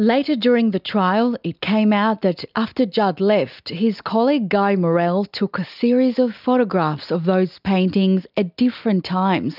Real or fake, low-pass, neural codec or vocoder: real; 5.4 kHz; none